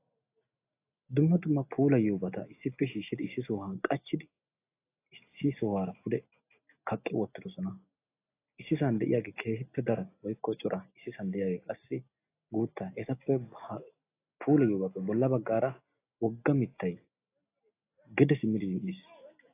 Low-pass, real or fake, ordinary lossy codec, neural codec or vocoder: 3.6 kHz; real; AAC, 24 kbps; none